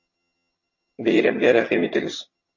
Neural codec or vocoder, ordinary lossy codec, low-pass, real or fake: vocoder, 22.05 kHz, 80 mel bands, HiFi-GAN; MP3, 32 kbps; 7.2 kHz; fake